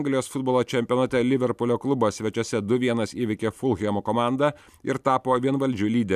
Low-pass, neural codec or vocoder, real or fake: 14.4 kHz; none; real